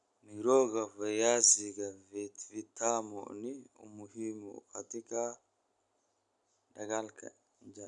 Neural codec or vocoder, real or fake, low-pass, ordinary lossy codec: none; real; 10.8 kHz; none